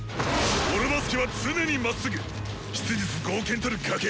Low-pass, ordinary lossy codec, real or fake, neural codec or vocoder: none; none; real; none